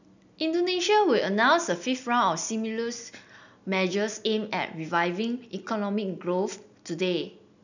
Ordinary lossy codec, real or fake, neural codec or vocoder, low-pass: none; real; none; 7.2 kHz